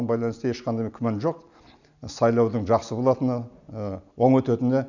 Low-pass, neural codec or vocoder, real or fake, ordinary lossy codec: 7.2 kHz; none; real; none